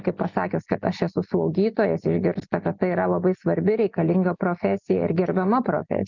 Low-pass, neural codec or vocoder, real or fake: 7.2 kHz; none; real